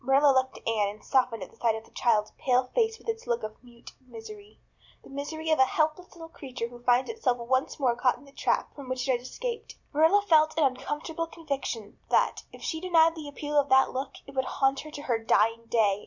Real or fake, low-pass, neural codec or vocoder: real; 7.2 kHz; none